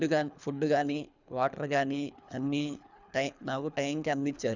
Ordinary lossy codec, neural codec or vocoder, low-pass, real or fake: none; codec, 24 kHz, 3 kbps, HILCodec; 7.2 kHz; fake